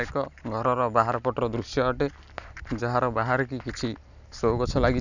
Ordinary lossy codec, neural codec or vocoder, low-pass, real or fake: none; none; 7.2 kHz; real